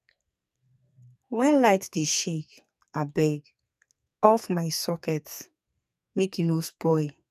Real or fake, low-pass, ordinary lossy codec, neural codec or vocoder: fake; 14.4 kHz; none; codec, 44.1 kHz, 2.6 kbps, SNAC